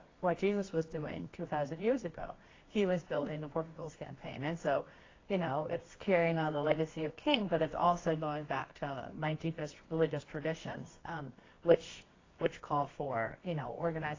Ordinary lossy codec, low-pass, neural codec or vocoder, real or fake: AAC, 32 kbps; 7.2 kHz; codec, 24 kHz, 0.9 kbps, WavTokenizer, medium music audio release; fake